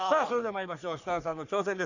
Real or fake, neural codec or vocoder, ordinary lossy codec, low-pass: fake; codec, 44.1 kHz, 3.4 kbps, Pupu-Codec; none; 7.2 kHz